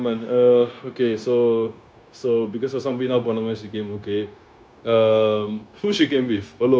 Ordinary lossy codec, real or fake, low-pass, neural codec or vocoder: none; fake; none; codec, 16 kHz, 0.9 kbps, LongCat-Audio-Codec